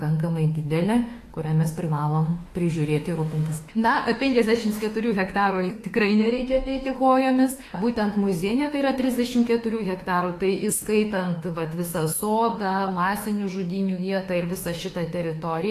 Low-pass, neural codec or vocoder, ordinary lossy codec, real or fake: 14.4 kHz; autoencoder, 48 kHz, 32 numbers a frame, DAC-VAE, trained on Japanese speech; AAC, 48 kbps; fake